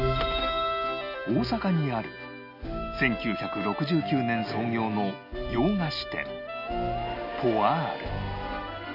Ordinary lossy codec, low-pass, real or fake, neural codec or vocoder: none; 5.4 kHz; real; none